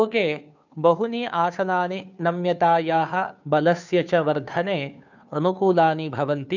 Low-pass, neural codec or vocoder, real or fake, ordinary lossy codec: 7.2 kHz; codec, 16 kHz, 2 kbps, FunCodec, trained on Chinese and English, 25 frames a second; fake; none